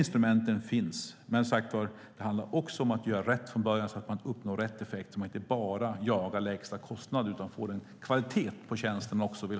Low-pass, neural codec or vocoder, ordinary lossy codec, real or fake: none; none; none; real